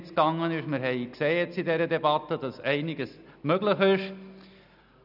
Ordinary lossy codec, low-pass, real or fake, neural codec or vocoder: none; 5.4 kHz; real; none